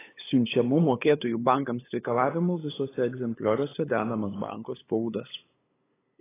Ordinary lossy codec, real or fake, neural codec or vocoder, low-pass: AAC, 16 kbps; fake; codec, 16 kHz, 8 kbps, FunCodec, trained on LibriTTS, 25 frames a second; 3.6 kHz